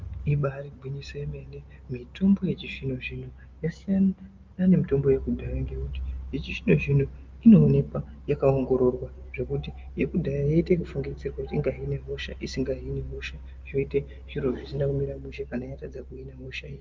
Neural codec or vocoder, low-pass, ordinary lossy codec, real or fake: none; 7.2 kHz; Opus, 32 kbps; real